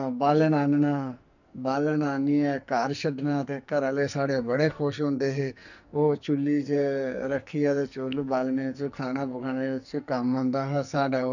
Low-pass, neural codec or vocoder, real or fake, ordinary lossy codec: 7.2 kHz; codec, 44.1 kHz, 2.6 kbps, SNAC; fake; none